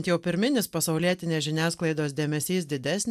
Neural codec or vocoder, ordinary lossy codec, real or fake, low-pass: none; AAC, 96 kbps; real; 14.4 kHz